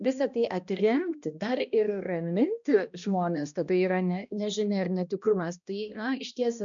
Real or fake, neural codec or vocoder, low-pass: fake; codec, 16 kHz, 1 kbps, X-Codec, HuBERT features, trained on balanced general audio; 7.2 kHz